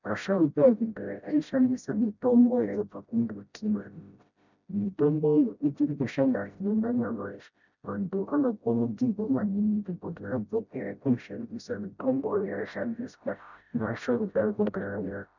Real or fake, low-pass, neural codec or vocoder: fake; 7.2 kHz; codec, 16 kHz, 0.5 kbps, FreqCodec, smaller model